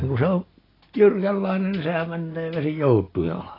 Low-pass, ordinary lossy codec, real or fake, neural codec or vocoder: 5.4 kHz; AAC, 24 kbps; real; none